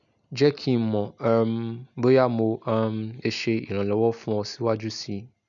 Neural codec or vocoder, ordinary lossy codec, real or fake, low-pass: none; none; real; 7.2 kHz